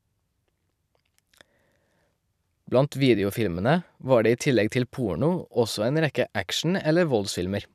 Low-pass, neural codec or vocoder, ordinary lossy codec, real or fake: 14.4 kHz; vocoder, 44.1 kHz, 128 mel bands every 256 samples, BigVGAN v2; none; fake